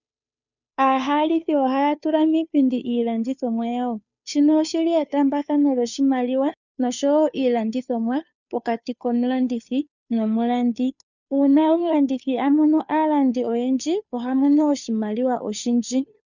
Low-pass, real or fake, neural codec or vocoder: 7.2 kHz; fake; codec, 16 kHz, 2 kbps, FunCodec, trained on Chinese and English, 25 frames a second